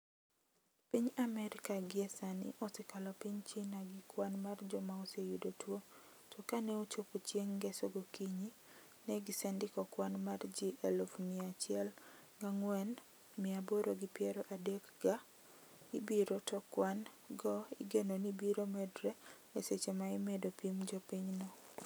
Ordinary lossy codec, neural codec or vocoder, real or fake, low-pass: none; none; real; none